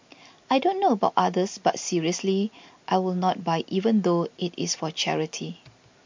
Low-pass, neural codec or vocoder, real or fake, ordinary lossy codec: 7.2 kHz; none; real; MP3, 48 kbps